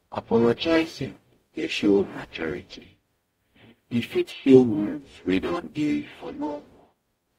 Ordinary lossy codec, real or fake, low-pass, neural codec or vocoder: AAC, 48 kbps; fake; 19.8 kHz; codec, 44.1 kHz, 0.9 kbps, DAC